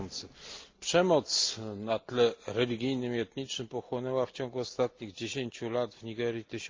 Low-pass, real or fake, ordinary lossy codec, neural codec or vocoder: 7.2 kHz; real; Opus, 32 kbps; none